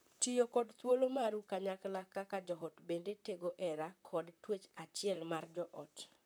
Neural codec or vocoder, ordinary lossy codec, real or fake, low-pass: vocoder, 44.1 kHz, 128 mel bands, Pupu-Vocoder; none; fake; none